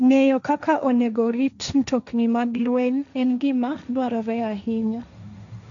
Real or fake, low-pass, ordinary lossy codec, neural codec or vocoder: fake; 7.2 kHz; none; codec, 16 kHz, 1.1 kbps, Voila-Tokenizer